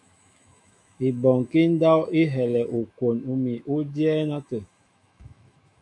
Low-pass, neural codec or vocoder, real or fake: 10.8 kHz; autoencoder, 48 kHz, 128 numbers a frame, DAC-VAE, trained on Japanese speech; fake